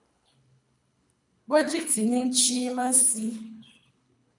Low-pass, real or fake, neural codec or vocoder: 10.8 kHz; fake; codec, 24 kHz, 3 kbps, HILCodec